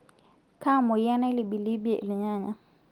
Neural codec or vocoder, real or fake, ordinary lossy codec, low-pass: none; real; Opus, 24 kbps; 19.8 kHz